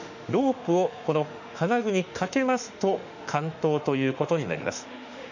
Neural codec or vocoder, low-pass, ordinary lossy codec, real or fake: autoencoder, 48 kHz, 32 numbers a frame, DAC-VAE, trained on Japanese speech; 7.2 kHz; none; fake